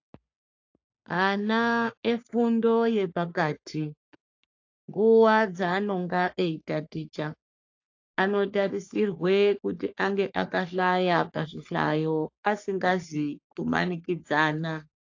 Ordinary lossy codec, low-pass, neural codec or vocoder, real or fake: AAC, 48 kbps; 7.2 kHz; codec, 44.1 kHz, 3.4 kbps, Pupu-Codec; fake